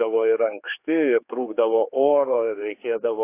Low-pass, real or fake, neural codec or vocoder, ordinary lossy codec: 3.6 kHz; fake; codec, 16 kHz, 4 kbps, X-Codec, HuBERT features, trained on general audio; AAC, 24 kbps